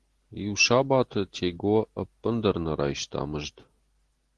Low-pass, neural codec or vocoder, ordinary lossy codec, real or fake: 10.8 kHz; none; Opus, 16 kbps; real